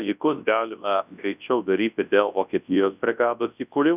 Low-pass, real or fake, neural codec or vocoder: 3.6 kHz; fake; codec, 24 kHz, 0.9 kbps, WavTokenizer, large speech release